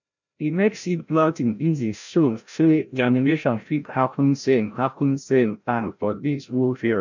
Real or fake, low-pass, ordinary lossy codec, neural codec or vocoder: fake; 7.2 kHz; none; codec, 16 kHz, 0.5 kbps, FreqCodec, larger model